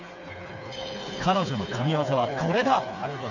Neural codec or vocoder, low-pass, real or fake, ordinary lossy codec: codec, 16 kHz, 4 kbps, FreqCodec, smaller model; 7.2 kHz; fake; none